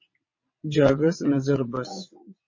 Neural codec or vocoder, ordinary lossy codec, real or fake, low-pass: vocoder, 22.05 kHz, 80 mel bands, WaveNeXt; MP3, 32 kbps; fake; 7.2 kHz